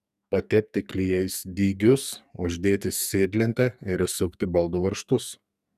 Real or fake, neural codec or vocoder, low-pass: fake; codec, 44.1 kHz, 2.6 kbps, SNAC; 14.4 kHz